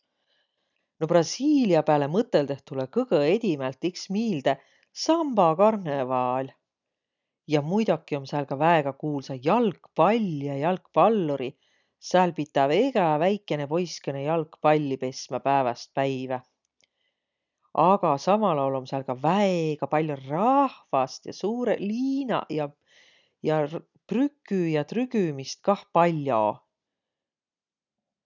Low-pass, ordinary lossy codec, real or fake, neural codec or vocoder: 7.2 kHz; none; real; none